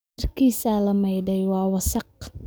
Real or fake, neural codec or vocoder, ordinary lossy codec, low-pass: fake; codec, 44.1 kHz, 7.8 kbps, DAC; none; none